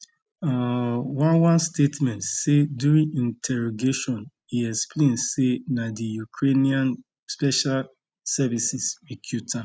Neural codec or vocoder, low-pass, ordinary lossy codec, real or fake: none; none; none; real